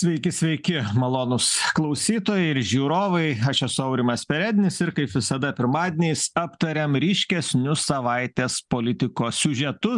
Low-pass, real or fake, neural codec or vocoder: 10.8 kHz; real; none